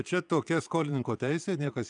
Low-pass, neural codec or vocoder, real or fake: 9.9 kHz; vocoder, 22.05 kHz, 80 mel bands, Vocos; fake